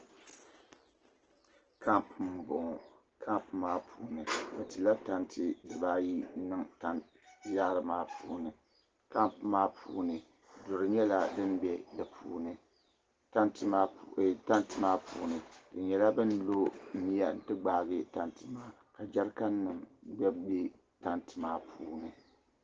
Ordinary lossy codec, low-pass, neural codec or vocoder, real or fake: Opus, 16 kbps; 7.2 kHz; none; real